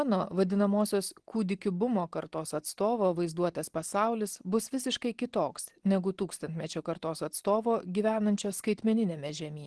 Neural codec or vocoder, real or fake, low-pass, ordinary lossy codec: none; real; 10.8 kHz; Opus, 16 kbps